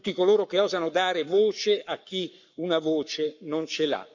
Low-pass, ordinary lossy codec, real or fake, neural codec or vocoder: 7.2 kHz; none; fake; codec, 44.1 kHz, 7.8 kbps, Pupu-Codec